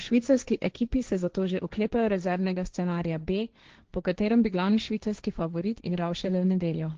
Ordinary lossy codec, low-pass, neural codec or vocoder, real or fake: Opus, 16 kbps; 7.2 kHz; codec, 16 kHz, 1.1 kbps, Voila-Tokenizer; fake